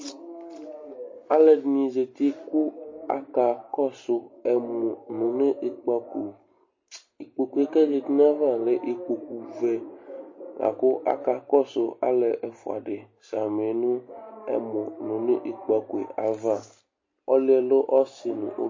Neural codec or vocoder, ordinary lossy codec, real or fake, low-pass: none; MP3, 32 kbps; real; 7.2 kHz